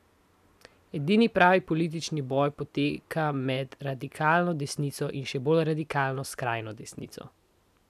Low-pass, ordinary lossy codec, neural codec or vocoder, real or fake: 14.4 kHz; none; none; real